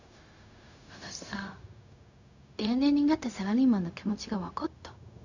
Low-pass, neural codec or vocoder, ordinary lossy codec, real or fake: 7.2 kHz; codec, 16 kHz, 0.4 kbps, LongCat-Audio-Codec; none; fake